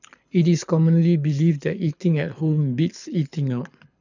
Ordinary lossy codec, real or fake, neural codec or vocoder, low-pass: none; fake; codec, 24 kHz, 6 kbps, HILCodec; 7.2 kHz